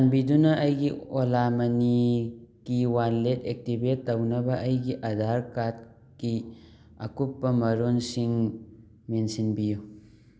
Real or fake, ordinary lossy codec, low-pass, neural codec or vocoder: real; none; none; none